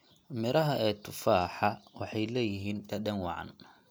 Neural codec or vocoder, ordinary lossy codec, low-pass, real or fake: none; none; none; real